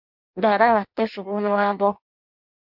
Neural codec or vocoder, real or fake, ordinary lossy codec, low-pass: codec, 16 kHz in and 24 kHz out, 0.6 kbps, FireRedTTS-2 codec; fake; MP3, 48 kbps; 5.4 kHz